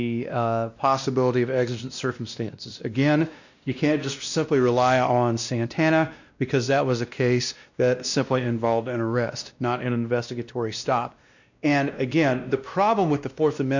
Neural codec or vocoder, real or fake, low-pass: codec, 16 kHz, 1 kbps, X-Codec, WavLM features, trained on Multilingual LibriSpeech; fake; 7.2 kHz